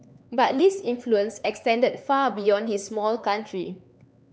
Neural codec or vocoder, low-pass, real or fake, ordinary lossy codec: codec, 16 kHz, 4 kbps, X-Codec, HuBERT features, trained on LibriSpeech; none; fake; none